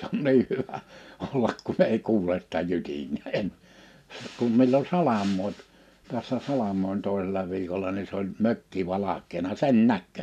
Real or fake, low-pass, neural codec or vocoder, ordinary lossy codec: real; 14.4 kHz; none; none